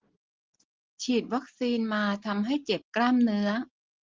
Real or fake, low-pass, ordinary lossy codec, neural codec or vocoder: real; 7.2 kHz; Opus, 16 kbps; none